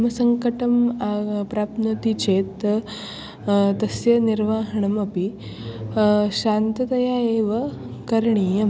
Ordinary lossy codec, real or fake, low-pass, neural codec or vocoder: none; real; none; none